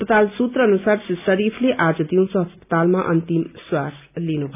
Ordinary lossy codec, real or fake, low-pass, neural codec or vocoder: none; real; 3.6 kHz; none